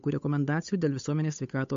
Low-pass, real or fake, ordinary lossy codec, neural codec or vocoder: 7.2 kHz; fake; MP3, 48 kbps; codec, 16 kHz, 8 kbps, FunCodec, trained on Chinese and English, 25 frames a second